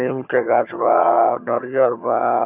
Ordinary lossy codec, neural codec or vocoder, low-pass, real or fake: none; vocoder, 22.05 kHz, 80 mel bands, HiFi-GAN; 3.6 kHz; fake